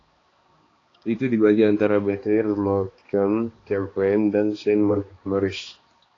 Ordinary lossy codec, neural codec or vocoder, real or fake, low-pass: AAC, 32 kbps; codec, 16 kHz, 2 kbps, X-Codec, HuBERT features, trained on balanced general audio; fake; 7.2 kHz